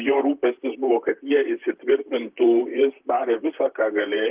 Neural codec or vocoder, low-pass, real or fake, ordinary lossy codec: vocoder, 44.1 kHz, 128 mel bands, Pupu-Vocoder; 3.6 kHz; fake; Opus, 32 kbps